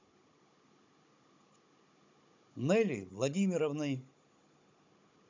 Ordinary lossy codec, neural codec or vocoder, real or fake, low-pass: MP3, 64 kbps; codec, 16 kHz, 16 kbps, FunCodec, trained on Chinese and English, 50 frames a second; fake; 7.2 kHz